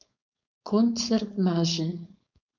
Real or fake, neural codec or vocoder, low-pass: fake; codec, 16 kHz, 4.8 kbps, FACodec; 7.2 kHz